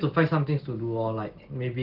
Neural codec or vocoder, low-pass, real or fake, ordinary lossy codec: none; 5.4 kHz; real; Opus, 16 kbps